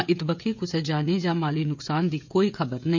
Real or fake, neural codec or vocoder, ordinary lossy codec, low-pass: fake; codec, 16 kHz, 16 kbps, FreqCodec, smaller model; none; 7.2 kHz